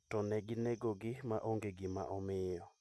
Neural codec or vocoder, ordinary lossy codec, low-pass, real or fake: none; none; none; real